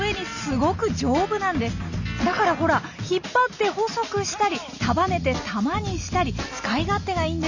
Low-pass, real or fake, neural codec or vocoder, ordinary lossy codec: 7.2 kHz; real; none; none